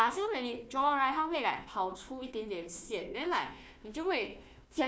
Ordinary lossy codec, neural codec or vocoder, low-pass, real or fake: none; codec, 16 kHz, 1 kbps, FunCodec, trained on Chinese and English, 50 frames a second; none; fake